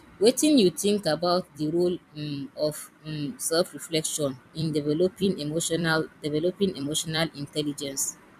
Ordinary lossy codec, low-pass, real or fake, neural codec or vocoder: none; 14.4 kHz; fake; vocoder, 44.1 kHz, 128 mel bands every 256 samples, BigVGAN v2